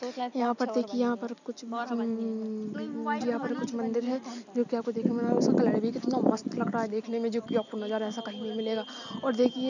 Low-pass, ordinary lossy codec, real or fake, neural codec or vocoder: 7.2 kHz; none; real; none